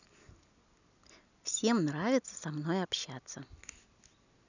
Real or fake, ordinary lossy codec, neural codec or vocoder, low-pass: real; none; none; 7.2 kHz